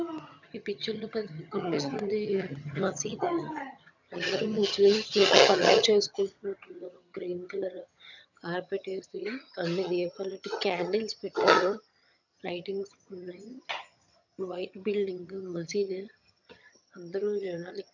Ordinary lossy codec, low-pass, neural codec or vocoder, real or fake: none; 7.2 kHz; vocoder, 22.05 kHz, 80 mel bands, HiFi-GAN; fake